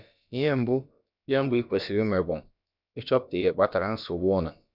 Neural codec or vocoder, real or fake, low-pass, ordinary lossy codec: codec, 16 kHz, about 1 kbps, DyCAST, with the encoder's durations; fake; 5.4 kHz; none